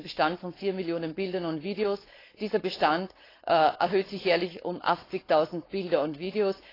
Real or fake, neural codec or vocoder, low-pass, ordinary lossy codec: fake; codec, 16 kHz, 4.8 kbps, FACodec; 5.4 kHz; AAC, 24 kbps